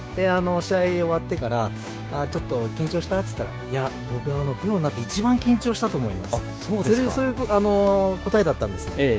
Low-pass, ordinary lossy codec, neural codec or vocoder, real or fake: none; none; codec, 16 kHz, 6 kbps, DAC; fake